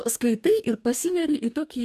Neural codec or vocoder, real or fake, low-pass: codec, 44.1 kHz, 2.6 kbps, DAC; fake; 14.4 kHz